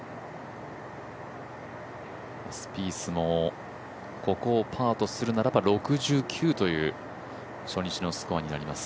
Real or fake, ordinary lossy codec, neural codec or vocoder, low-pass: real; none; none; none